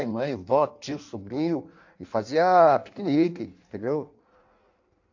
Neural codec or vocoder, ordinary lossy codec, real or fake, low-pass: codec, 16 kHz in and 24 kHz out, 1.1 kbps, FireRedTTS-2 codec; AAC, 48 kbps; fake; 7.2 kHz